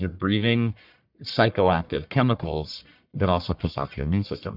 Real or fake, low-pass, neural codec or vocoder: fake; 5.4 kHz; codec, 44.1 kHz, 1.7 kbps, Pupu-Codec